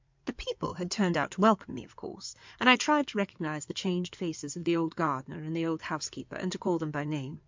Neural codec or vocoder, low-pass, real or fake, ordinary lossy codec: codec, 16 kHz in and 24 kHz out, 2.2 kbps, FireRedTTS-2 codec; 7.2 kHz; fake; MP3, 64 kbps